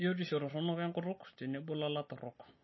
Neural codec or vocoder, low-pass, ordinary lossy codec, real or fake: none; 7.2 kHz; MP3, 24 kbps; real